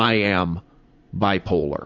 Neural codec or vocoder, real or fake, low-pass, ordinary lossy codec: vocoder, 44.1 kHz, 80 mel bands, Vocos; fake; 7.2 kHz; AAC, 48 kbps